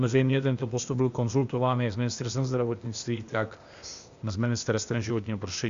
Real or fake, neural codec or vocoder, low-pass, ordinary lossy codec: fake; codec, 16 kHz, 0.8 kbps, ZipCodec; 7.2 kHz; Opus, 64 kbps